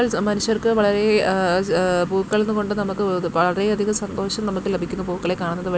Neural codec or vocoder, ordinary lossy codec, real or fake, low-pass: none; none; real; none